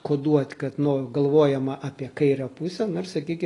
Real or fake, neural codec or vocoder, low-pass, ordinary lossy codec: real; none; 10.8 kHz; AAC, 32 kbps